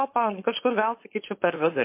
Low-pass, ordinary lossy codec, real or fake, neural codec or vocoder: 3.6 kHz; MP3, 24 kbps; fake; codec, 16 kHz, 4.8 kbps, FACodec